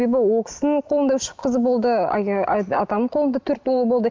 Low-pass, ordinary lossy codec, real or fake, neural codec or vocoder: 7.2 kHz; Opus, 24 kbps; real; none